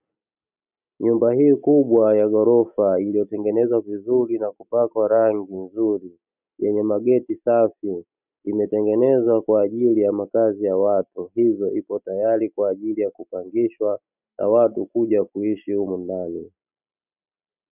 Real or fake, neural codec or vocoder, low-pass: real; none; 3.6 kHz